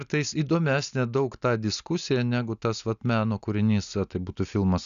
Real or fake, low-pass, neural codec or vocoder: real; 7.2 kHz; none